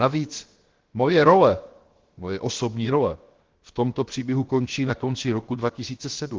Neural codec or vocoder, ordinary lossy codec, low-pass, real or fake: codec, 16 kHz, about 1 kbps, DyCAST, with the encoder's durations; Opus, 16 kbps; 7.2 kHz; fake